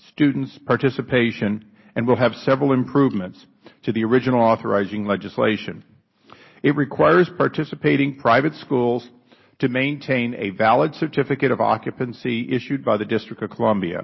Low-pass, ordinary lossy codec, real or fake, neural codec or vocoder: 7.2 kHz; MP3, 24 kbps; real; none